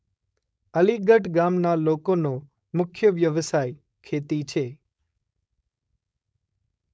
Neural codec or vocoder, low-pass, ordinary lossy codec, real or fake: codec, 16 kHz, 4.8 kbps, FACodec; none; none; fake